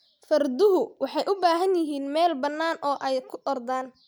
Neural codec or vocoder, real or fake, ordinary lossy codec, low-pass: none; real; none; none